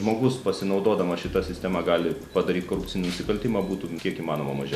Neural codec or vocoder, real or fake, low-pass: none; real; 14.4 kHz